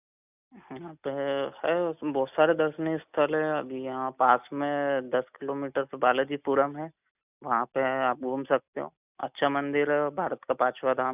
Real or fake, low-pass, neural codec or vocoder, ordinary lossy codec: real; 3.6 kHz; none; none